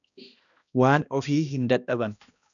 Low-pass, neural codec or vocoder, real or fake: 7.2 kHz; codec, 16 kHz, 1 kbps, X-Codec, HuBERT features, trained on balanced general audio; fake